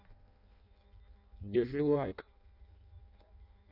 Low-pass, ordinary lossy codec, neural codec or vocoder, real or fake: 5.4 kHz; none; codec, 16 kHz in and 24 kHz out, 0.6 kbps, FireRedTTS-2 codec; fake